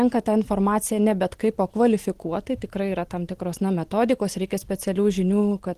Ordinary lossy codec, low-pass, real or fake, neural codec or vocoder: Opus, 16 kbps; 14.4 kHz; real; none